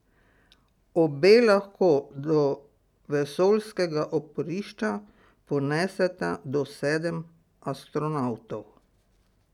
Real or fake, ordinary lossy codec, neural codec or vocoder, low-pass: real; none; none; 19.8 kHz